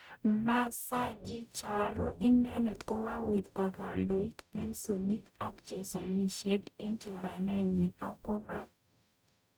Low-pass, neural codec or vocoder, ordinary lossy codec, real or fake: none; codec, 44.1 kHz, 0.9 kbps, DAC; none; fake